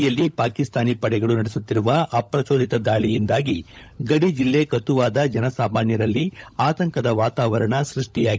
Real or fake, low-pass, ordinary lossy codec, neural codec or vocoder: fake; none; none; codec, 16 kHz, 16 kbps, FunCodec, trained on LibriTTS, 50 frames a second